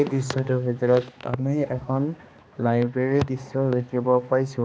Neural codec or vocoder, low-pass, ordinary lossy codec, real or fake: codec, 16 kHz, 2 kbps, X-Codec, HuBERT features, trained on balanced general audio; none; none; fake